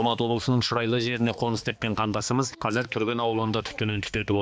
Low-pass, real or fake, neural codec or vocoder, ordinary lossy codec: none; fake; codec, 16 kHz, 2 kbps, X-Codec, HuBERT features, trained on balanced general audio; none